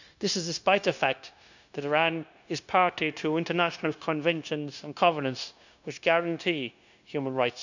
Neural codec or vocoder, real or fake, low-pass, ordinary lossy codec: codec, 16 kHz, 0.9 kbps, LongCat-Audio-Codec; fake; 7.2 kHz; none